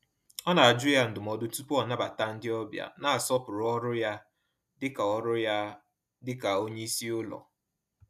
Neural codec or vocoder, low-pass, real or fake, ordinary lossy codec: none; 14.4 kHz; real; none